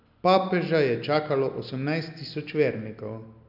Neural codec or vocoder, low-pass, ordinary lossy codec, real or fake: none; 5.4 kHz; AAC, 48 kbps; real